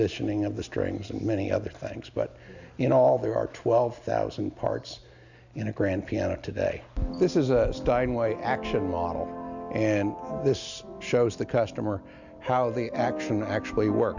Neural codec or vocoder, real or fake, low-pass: none; real; 7.2 kHz